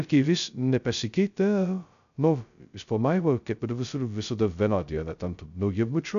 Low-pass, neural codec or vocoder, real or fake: 7.2 kHz; codec, 16 kHz, 0.2 kbps, FocalCodec; fake